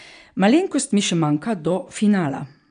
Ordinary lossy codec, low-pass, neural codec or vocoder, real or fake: none; 9.9 kHz; none; real